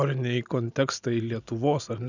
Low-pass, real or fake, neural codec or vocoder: 7.2 kHz; real; none